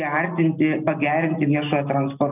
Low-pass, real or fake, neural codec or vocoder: 3.6 kHz; real; none